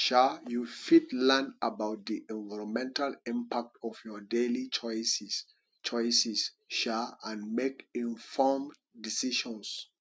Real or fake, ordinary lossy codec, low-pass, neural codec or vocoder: real; none; none; none